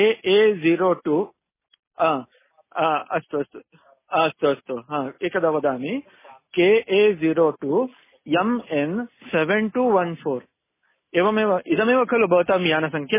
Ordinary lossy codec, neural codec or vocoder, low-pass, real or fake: MP3, 16 kbps; none; 3.6 kHz; real